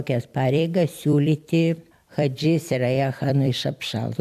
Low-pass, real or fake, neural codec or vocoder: 14.4 kHz; fake; vocoder, 44.1 kHz, 128 mel bands every 256 samples, BigVGAN v2